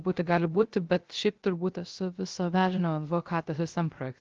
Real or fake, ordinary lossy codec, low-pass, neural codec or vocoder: fake; Opus, 16 kbps; 7.2 kHz; codec, 16 kHz, 0.3 kbps, FocalCodec